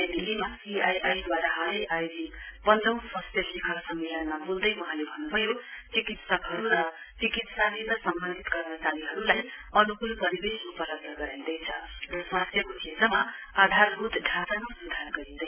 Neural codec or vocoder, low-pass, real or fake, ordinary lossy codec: none; 3.6 kHz; real; none